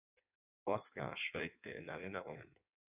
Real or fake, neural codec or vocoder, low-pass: fake; codec, 16 kHz in and 24 kHz out, 1.1 kbps, FireRedTTS-2 codec; 3.6 kHz